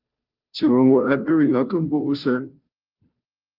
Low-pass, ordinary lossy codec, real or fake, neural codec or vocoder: 5.4 kHz; Opus, 32 kbps; fake; codec, 16 kHz, 0.5 kbps, FunCodec, trained on Chinese and English, 25 frames a second